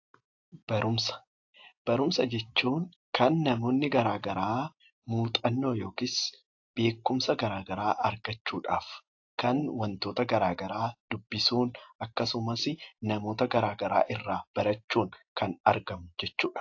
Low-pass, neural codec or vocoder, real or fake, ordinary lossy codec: 7.2 kHz; none; real; AAC, 48 kbps